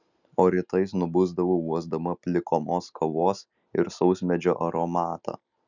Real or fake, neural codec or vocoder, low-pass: real; none; 7.2 kHz